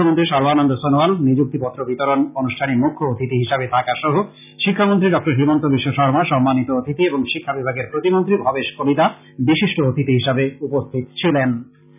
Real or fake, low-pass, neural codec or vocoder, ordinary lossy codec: real; 3.6 kHz; none; none